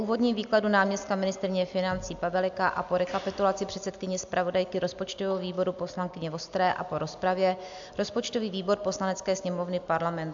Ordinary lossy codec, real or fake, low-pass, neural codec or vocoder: AAC, 64 kbps; real; 7.2 kHz; none